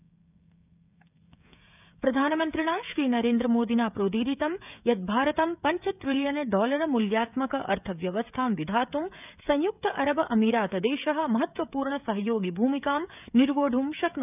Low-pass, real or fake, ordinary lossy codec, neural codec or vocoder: 3.6 kHz; fake; none; codec, 16 kHz, 16 kbps, FreqCodec, smaller model